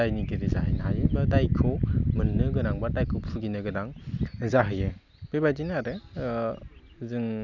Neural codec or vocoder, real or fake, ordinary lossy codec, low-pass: none; real; none; 7.2 kHz